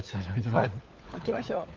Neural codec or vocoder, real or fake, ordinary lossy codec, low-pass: codec, 16 kHz, 4 kbps, FunCodec, trained on LibriTTS, 50 frames a second; fake; Opus, 32 kbps; 7.2 kHz